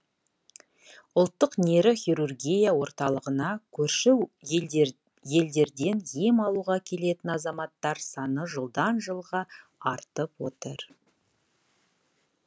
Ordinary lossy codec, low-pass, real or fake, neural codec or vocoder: none; none; real; none